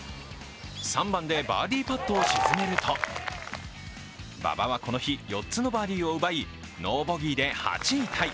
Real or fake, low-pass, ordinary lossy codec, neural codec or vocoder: real; none; none; none